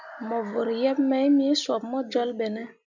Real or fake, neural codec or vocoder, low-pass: real; none; 7.2 kHz